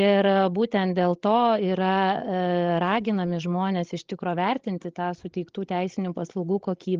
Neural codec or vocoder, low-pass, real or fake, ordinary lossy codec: codec, 16 kHz, 16 kbps, FreqCodec, larger model; 7.2 kHz; fake; Opus, 16 kbps